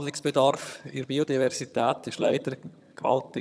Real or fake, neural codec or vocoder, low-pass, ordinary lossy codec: fake; vocoder, 22.05 kHz, 80 mel bands, HiFi-GAN; none; none